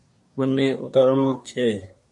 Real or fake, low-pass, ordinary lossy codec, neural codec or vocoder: fake; 10.8 kHz; MP3, 48 kbps; codec, 24 kHz, 1 kbps, SNAC